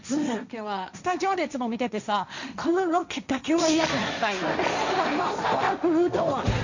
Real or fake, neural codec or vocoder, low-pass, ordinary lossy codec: fake; codec, 16 kHz, 1.1 kbps, Voila-Tokenizer; 7.2 kHz; none